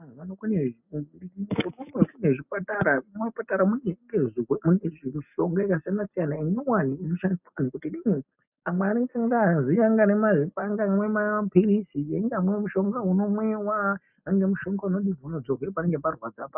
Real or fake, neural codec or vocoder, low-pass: real; none; 3.6 kHz